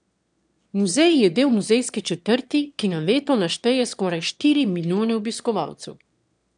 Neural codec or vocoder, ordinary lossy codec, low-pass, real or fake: autoencoder, 22.05 kHz, a latent of 192 numbers a frame, VITS, trained on one speaker; none; 9.9 kHz; fake